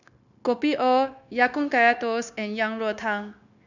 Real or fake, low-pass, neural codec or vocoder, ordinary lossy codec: fake; 7.2 kHz; codec, 16 kHz, 0.9 kbps, LongCat-Audio-Codec; none